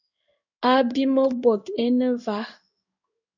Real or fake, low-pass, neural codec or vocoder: fake; 7.2 kHz; codec, 16 kHz in and 24 kHz out, 1 kbps, XY-Tokenizer